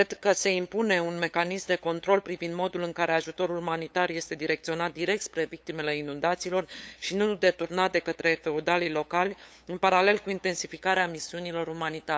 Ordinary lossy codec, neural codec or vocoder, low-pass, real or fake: none; codec, 16 kHz, 8 kbps, FunCodec, trained on LibriTTS, 25 frames a second; none; fake